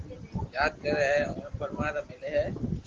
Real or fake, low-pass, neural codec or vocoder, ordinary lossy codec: real; 7.2 kHz; none; Opus, 16 kbps